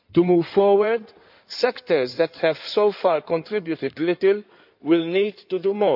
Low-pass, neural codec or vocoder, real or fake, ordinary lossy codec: 5.4 kHz; codec, 16 kHz in and 24 kHz out, 2.2 kbps, FireRedTTS-2 codec; fake; none